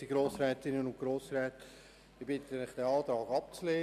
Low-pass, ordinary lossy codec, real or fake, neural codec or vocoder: 14.4 kHz; none; real; none